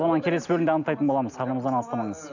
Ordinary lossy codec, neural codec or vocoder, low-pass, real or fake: none; none; 7.2 kHz; real